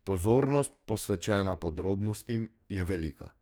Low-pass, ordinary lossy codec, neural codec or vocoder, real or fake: none; none; codec, 44.1 kHz, 2.6 kbps, DAC; fake